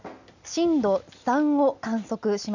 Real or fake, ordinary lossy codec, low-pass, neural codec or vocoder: real; none; 7.2 kHz; none